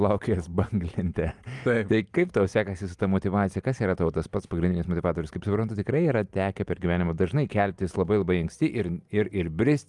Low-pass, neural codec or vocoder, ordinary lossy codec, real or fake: 10.8 kHz; none; Opus, 32 kbps; real